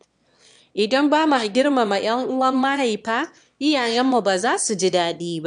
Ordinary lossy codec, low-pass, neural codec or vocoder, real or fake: none; 9.9 kHz; autoencoder, 22.05 kHz, a latent of 192 numbers a frame, VITS, trained on one speaker; fake